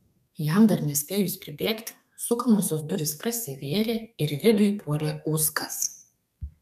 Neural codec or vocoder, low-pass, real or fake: codec, 32 kHz, 1.9 kbps, SNAC; 14.4 kHz; fake